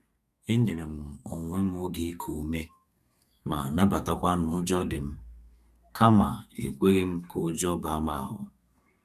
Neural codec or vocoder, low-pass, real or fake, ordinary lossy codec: codec, 32 kHz, 1.9 kbps, SNAC; 14.4 kHz; fake; none